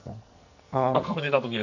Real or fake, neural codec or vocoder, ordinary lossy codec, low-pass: fake; codec, 44.1 kHz, 2.6 kbps, SNAC; none; 7.2 kHz